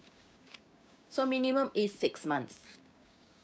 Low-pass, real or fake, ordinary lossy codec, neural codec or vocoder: none; fake; none; codec, 16 kHz, 6 kbps, DAC